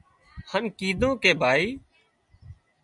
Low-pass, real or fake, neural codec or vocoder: 10.8 kHz; real; none